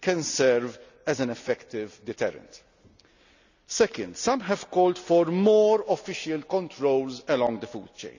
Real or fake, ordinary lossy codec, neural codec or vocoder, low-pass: real; none; none; 7.2 kHz